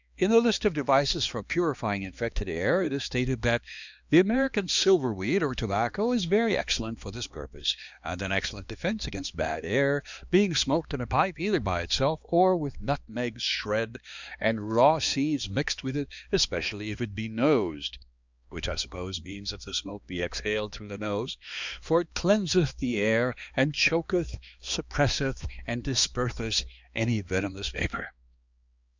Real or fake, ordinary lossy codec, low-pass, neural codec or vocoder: fake; Opus, 64 kbps; 7.2 kHz; codec, 16 kHz, 2 kbps, X-Codec, HuBERT features, trained on balanced general audio